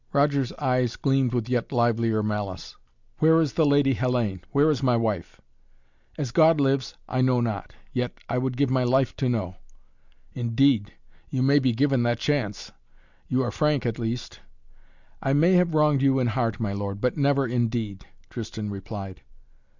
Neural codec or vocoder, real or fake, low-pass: none; real; 7.2 kHz